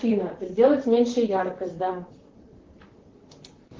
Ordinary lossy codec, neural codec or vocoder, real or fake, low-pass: Opus, 16 kbps; vocoder, 44.1 kHz, 128 mel bands, Pupu-Vocoder; fake; 7.2 kHz